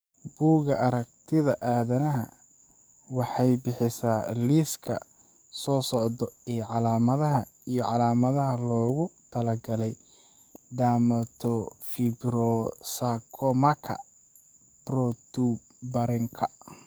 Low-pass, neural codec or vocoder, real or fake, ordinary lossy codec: none; codec, 44.1 kHz, 7.8 kbps, DAC; fake; none